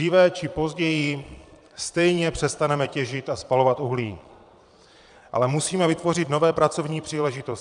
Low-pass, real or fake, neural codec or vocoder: 9.9 kHz; fake; vocoder, 22.05 kHz, 80 mel bands, Vocos